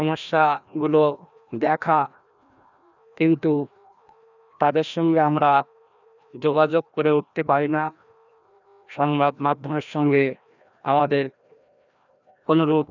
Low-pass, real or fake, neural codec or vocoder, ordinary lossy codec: 7.2 kHz; fake; codec, 16 kHz, 1 kbps, FreqCodec, larger model; none